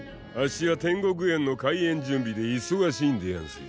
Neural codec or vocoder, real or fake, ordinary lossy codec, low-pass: none; real; none; none